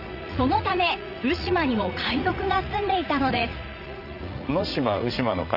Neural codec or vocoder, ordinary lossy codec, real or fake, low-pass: vocoder, 44.1 kHz, 80 mel bands, Vocos; none; fake; 5.4 kHz